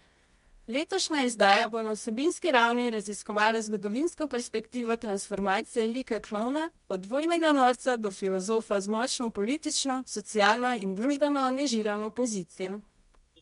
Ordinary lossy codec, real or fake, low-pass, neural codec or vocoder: MP3, 64 kbps; fake; 10.8 kHz; codec, 24 kHz, 0.9 kbps, WavTokenizer, medium music audio release